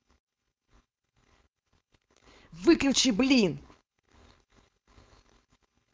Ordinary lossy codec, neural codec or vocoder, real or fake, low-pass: none; codec, 16 kHz, 4.8 kbps, FACodec; fake; none